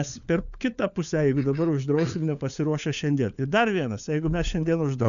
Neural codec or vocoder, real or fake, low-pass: codec, 16 kHz, 4 kbps, FunCodec, trained on LibriTTS, 50 frames a second; fake; 7.2 kHz